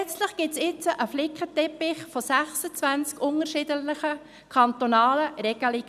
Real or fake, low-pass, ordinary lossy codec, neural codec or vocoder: real; 14.4 kHz; none; none